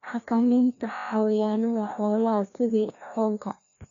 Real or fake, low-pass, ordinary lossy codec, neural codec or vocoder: fake; 7.2 kHz; none; codec, 16 kHz, 1 kbps, FreqCodec, larger model